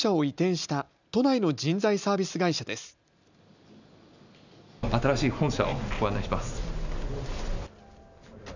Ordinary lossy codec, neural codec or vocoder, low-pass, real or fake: none; none; 7.2 kHz; real